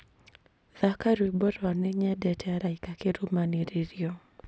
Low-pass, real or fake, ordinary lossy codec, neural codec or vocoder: none; real; none; none